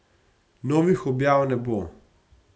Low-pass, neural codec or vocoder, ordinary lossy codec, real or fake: none; none; none; real